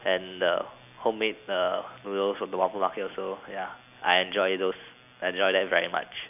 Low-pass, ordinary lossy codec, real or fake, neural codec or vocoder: 3.6 kHz; none; real; none